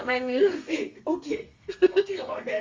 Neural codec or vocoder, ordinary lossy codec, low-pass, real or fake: codec, 32 kHz, 1.9 kbps, SNAC; Opus, 32 kbps; 7.2 kHz; fake